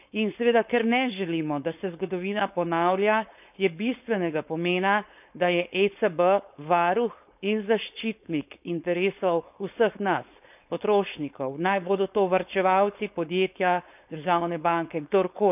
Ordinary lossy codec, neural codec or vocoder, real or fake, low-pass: none; codec, 16 kHz, 4.8 kbps, FACodec; fake; 3.6 kHz